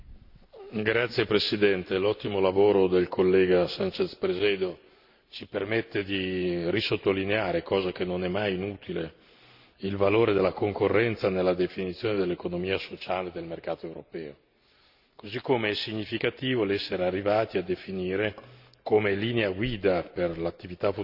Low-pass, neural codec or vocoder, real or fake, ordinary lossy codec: 5.4 kHz; none; real; Opus, 64 kbps